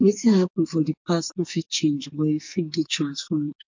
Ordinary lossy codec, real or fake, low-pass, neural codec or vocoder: MP3, 48 kbps; fake; 7.2 kHz; codec, 44.1 kHz, 2.6 kbps, SNAC